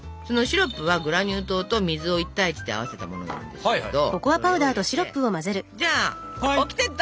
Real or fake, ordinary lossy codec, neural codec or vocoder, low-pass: real; none; none; none